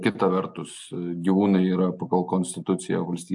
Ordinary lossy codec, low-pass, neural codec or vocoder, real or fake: AAC, 64 kbps; 10.8 kHz; none; real